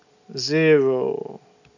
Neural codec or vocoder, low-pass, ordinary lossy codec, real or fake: none; 7.2 kHz; none; real